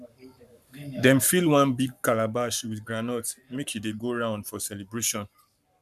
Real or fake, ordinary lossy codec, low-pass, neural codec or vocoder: fake; none; 14.4 kHz; codec, 44.1 kHz, 7.8 kbps, Pupu-Codec